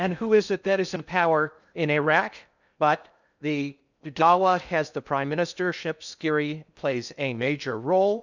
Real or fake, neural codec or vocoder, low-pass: fake; codec, 16 kHz in and 24 kHz out, 0.6 kbps, FocalCodec, streaming, 2048 codes; 7.2 kHz